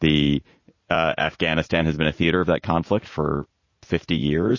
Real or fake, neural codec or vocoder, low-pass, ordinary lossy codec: fake; vocoder, 44.1 kHz, 80 mel bands, Vocos; 7.2 kHz; MP3, 32 kbps